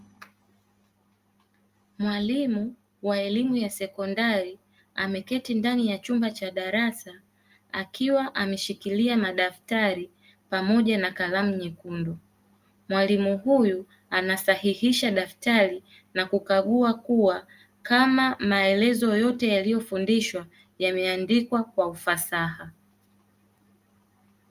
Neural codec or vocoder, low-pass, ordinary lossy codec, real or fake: none; 14.4 kHz; Opus, 32 kbps; real